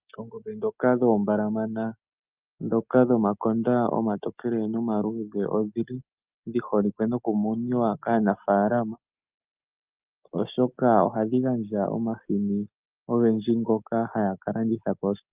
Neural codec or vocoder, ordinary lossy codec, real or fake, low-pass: none; Opus, 24 kbps; real; 3.6 kHz